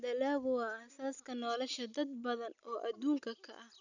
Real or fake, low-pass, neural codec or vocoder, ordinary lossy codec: real; 7.2 kHz; none; none